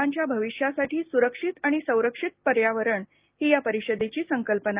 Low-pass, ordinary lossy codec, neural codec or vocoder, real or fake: 3.6 kHz; Opus, 24 kbps; none; real